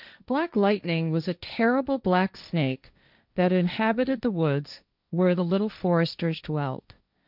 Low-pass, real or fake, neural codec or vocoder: 5.4 kHz; fake; codec, 16 kHz, 1.1 kbps, Voila-Tokenizer